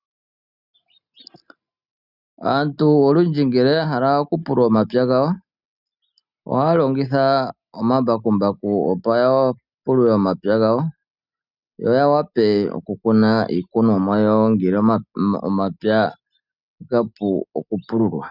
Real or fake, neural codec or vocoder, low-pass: real; none; 5.4 kHz